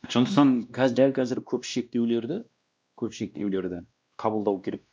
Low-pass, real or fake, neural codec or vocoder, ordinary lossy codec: none; fake; codec, 16 kHz, 1 kbps, X-Codec, WavLM features, trained on Multilingual LibriSpeech; none